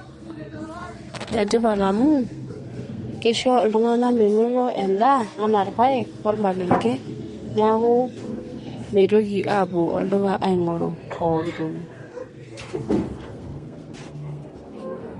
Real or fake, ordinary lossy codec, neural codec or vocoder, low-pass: fake; MP3, 48 kbps; codec, 32 kHz, 1.9 kbps, SNAC; 14.4 kHz